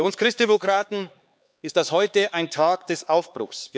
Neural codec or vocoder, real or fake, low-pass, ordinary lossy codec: codec, 16 kHz, 4 kbps, X-Codec, HuBERT features, trained on LibriSpeech; fake; none; none